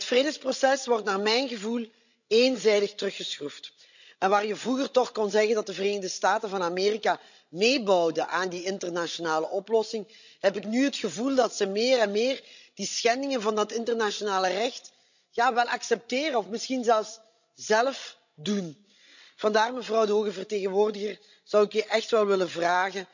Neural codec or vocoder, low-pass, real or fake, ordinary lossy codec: codec, 16 kHz, 16 kbps, FreqCodec, larger model; 7.2 kHz; fake; none